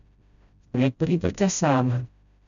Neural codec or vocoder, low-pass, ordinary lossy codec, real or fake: codec, 16 kHz, 0.5 kbps, FreqCodec, smaller model; 7.2 kHz; none; fake